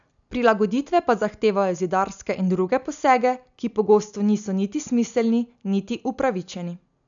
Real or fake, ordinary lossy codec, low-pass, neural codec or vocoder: real; none; 7.2 kHz; none